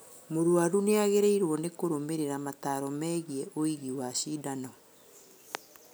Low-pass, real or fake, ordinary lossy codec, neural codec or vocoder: none; real; none; none